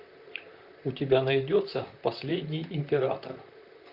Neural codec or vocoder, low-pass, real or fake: vocoder, 44.1 kHz, 128 mel bands, Pupu-Vocoder; 5.4 kHz; fake